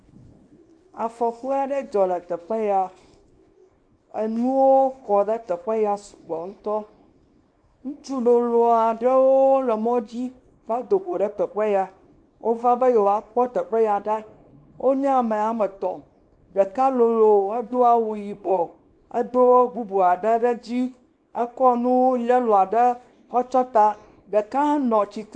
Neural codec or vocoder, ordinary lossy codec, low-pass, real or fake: codec, 24 kHz, 0.9 kbps, WavTokenizer, small release; MP3, 64 kbps; 9.9 kHz; fake